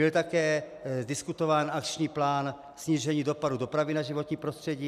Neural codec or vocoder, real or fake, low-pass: none; real; 14.4 kHz